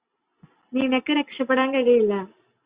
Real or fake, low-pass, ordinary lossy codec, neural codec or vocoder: real; 3.6 kHz; Opus, 64 kbps; none